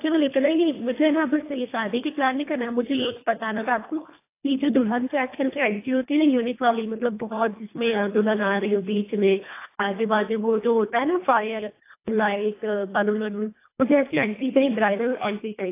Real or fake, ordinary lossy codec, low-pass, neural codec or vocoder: fake; AAC, 24 kbps; 3.6 kHz; codec, 24 kHz, 1.5 kbps, HILCodec